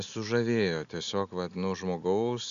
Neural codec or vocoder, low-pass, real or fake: none; 7.2 kHz; real